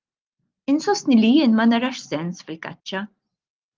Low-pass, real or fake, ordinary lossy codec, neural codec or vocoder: 7.2 kHz; real; Opus, 32 kbps; none